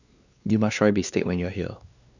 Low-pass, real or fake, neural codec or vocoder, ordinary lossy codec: 7.2 kHz; fake; codec, 16 kHz, 2 kbps, X-Codec, WavLM features, trained on Multilingual LibriSpeech; none